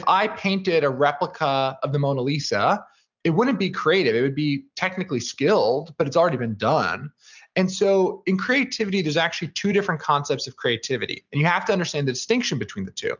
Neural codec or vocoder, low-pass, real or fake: none; 7.2 kHz; real